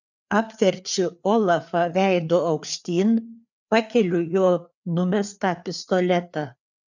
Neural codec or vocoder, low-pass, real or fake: codec, 16 kHz, 4 kbps, FreqCodec, larger model; 7.2 kHz; fake